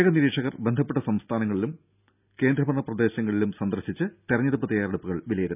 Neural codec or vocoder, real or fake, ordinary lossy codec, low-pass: none; real; none; 3.6 kHz